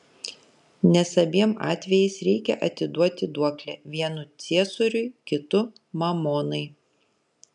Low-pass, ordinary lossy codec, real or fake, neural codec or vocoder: 10.8 kHz; MP3, 96 kbps; real; none